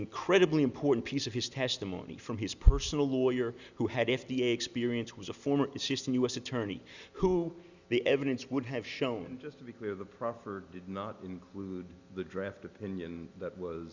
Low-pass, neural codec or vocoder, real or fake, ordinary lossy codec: 7.2 kHz; none; real; Opus, 64 kbps